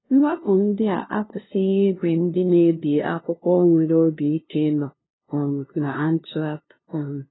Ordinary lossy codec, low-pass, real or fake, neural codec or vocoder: AAC, 16 kbps; 7.2 kHz; fake; codec, 16 kHz, 0.5 kbps, FunCodec, trained on LibriTTS, 25 frames a second